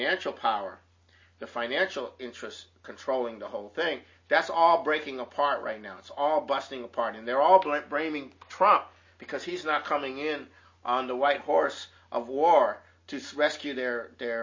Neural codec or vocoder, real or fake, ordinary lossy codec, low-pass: none; real; MP3, 32 kbps; 7.2 kHz